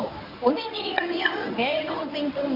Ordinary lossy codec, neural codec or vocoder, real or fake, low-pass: none; codec, 24 kHz, 0.9 kbps, WavTokenizer, medium speech release version 1; fake; 5.4 kHz